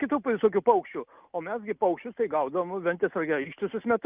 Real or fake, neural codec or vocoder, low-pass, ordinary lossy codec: real; none; 3.6 kHz; Opus, 32 kbps